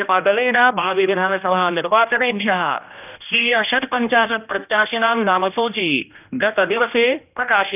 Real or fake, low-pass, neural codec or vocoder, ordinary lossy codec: fake; 3.6 kHz; codec, 16 kHz, 1 kbps, X-Codec, HuBERT features, trained on general audio; none